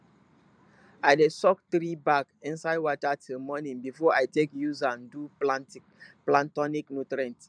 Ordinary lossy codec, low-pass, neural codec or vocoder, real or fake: MP3, 96 kbps; 9.9 kHz; vocoder, 44.1 kHz, 128 mel bands every 512 samples, BigVGAN v2; fake